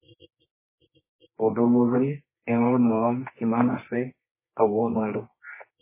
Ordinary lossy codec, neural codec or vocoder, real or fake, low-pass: MP3, 16 kbps; codec, 24 kHz, 0.9 kbps, WavTokenizer, medium music audio release; fake; 3.6 kHz